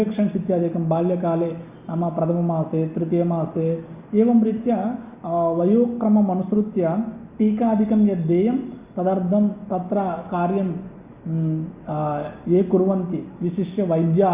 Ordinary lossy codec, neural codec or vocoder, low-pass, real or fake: Opus, 64 kbps; none; 3.6 kHz; real